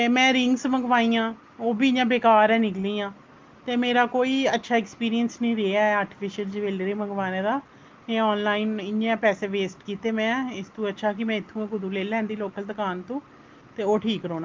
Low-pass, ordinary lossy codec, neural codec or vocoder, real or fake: 7.2 kHz; Opus, 32 kbps; none; real